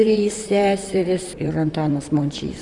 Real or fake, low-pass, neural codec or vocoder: fake; 10.8 kHz; vocoder, 44.1 kHz, 128 mel bands, Pupu-Vocoder